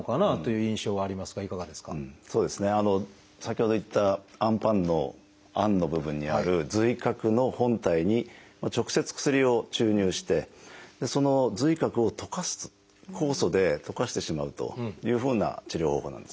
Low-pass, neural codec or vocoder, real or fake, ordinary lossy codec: none; none; real; none